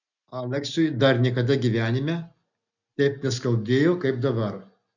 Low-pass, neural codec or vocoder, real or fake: 7.2 kHz; none; real